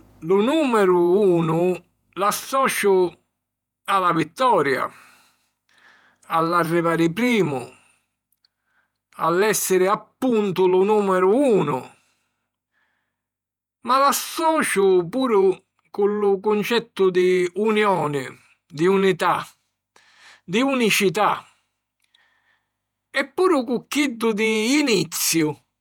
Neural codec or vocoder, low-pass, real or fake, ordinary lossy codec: vocoder, 48 kHz, 128 mel bands, Vocos; 19.8 kHz; fake; none